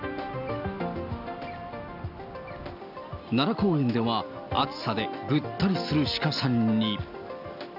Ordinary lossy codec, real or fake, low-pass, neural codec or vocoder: none; real; 5.4 kHz; none